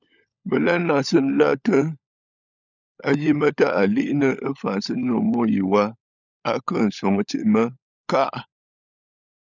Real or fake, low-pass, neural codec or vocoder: fake; 7.2 kHz; codec, 16 kHz, 16 kbps, FunCodec, trained on LibriTTS, 50 frames a second